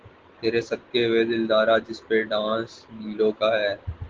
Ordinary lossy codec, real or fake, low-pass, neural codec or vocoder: Opus, 16 kbps; real; 7.2 kHz; none